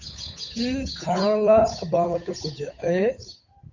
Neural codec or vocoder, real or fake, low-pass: codec, 16 kHz, 8 kbps, FunCodec, trained on Chinese and English, 25 frames a second; fake; 7.2 kHz